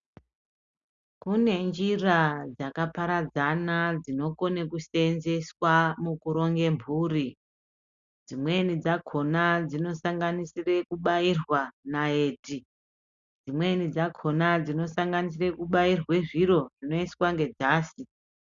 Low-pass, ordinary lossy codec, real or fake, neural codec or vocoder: 7.2 kHz; Opus, 64 kbps; real; none